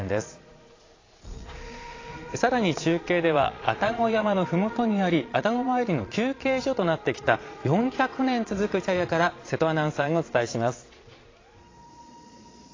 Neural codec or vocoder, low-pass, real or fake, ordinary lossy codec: vocoder, 22.05 kHz, 80 mel bands, Vocos; 7.2 kHz; fake; AAC, 32 kbps